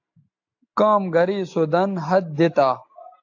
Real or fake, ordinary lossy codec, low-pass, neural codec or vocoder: real; AAC, 48 kbps; 7.2 kHz; none